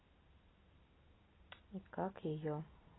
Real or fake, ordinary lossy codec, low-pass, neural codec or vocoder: real; AAC, 16 kbps; 7.2 kHz; none